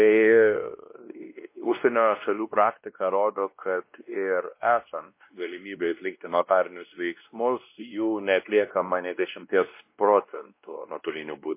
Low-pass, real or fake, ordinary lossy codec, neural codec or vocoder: 3.6 kHz; fake; MP3, 24 kbps; codec, 16 kHz, 1 kbps, X-Codec, WavLM features, trained on Multilingual LibriSpeech